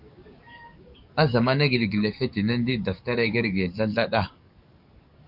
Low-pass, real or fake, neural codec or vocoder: 5.4 kHz; fake; codec, 44.1 kHz, 7.8 kbps, DAC